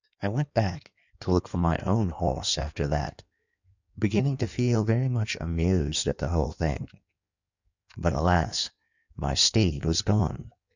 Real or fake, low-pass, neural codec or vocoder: fake; 7.2 kHz; codec, 16 kHz in and 24 kHz out, 1.1 kbps, FireRedTTS-2 codec